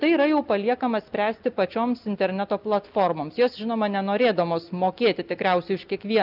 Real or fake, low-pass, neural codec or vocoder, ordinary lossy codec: real; 5.4 kHz; none; Opus, 24 kbps